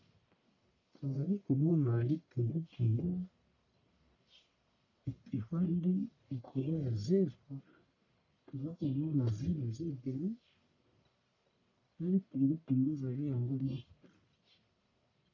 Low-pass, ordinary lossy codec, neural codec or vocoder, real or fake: 7.2 kHz; AAC, 48 kbps; codec, 44.1 kHz, 1.7 kbps, Pupu-Codec; fake